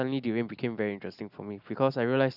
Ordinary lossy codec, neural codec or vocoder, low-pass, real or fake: none; none; 5.4 kHz; real